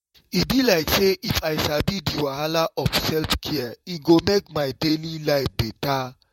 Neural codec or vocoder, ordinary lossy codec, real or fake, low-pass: vocoder, 44.1 kHz, 128 mel bands, Pupu-Vocoder; MP3, 64 kbps; fake; 19.8 kHz